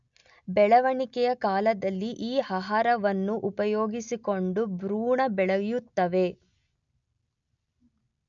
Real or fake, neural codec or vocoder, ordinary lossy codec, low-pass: real; none; none; 7.2 kHz